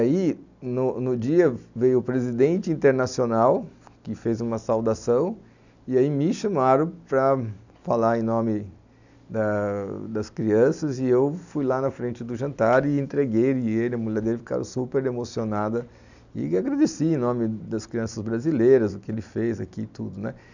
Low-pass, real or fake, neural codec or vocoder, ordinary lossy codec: 7.2 kHz; real; none; none